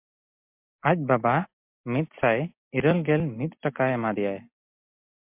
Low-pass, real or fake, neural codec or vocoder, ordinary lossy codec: 3.6 kHz; real; none; MP3, 32 kbps